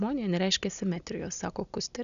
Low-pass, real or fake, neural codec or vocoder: 7.2 kHz; real; none